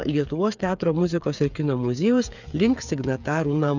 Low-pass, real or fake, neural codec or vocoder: 7.2 kHz; fake; codec, 16 kHz, 8 kbps, FreqCodec, smaller model